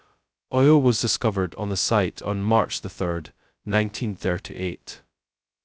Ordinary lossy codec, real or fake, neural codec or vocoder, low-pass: none; fake; codec, 16 kHz, 0.2 kbps, FocalCodec; none